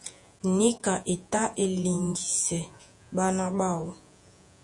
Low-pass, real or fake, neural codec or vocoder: 10.8 kHz; fake; vocoder, 48 kHz, 128 mel bands, Vocos